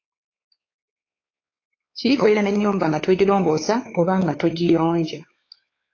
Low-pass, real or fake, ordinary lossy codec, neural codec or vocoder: 7.2 kHz; fake; AAC, 32 kbps; codec, 16 kHz, 4 kbps, X-Codec, WavLM features, trained on Multilingual LibriSpeech